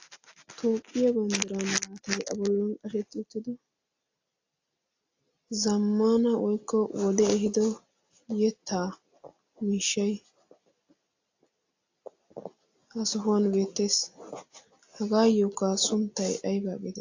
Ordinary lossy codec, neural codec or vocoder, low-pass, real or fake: AAC, 48 kbps; none; 7.2 kHz; real